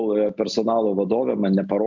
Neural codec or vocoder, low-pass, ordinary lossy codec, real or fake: none; 7.2 kHz; MP3, 64 kbps; real